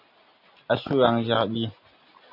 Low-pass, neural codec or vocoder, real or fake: 5.4 kHz; none; real